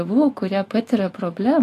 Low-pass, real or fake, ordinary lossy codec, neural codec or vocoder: 14.4 kHz; fake; AAC, 48 kbps; autoencoder, 48 kHz, 128 numbers a frame, DAC-VAE, trained on Japanese speech